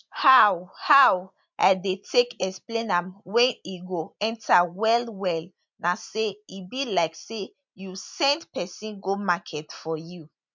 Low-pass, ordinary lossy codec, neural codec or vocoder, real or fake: 7.2 kHz; MP3, 64 kbps; none; real